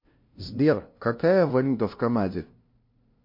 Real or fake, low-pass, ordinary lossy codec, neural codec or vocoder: fake; 5.4 kHz; MP3, 32 kbps; codec, 16 kHz, 0.5 kbps, FunCodec, trained on LibriTTS, 25 frames a second